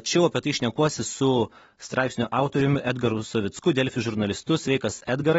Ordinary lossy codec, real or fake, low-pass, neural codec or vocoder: AAC, 24 kbps; real; 19.8 kHz; none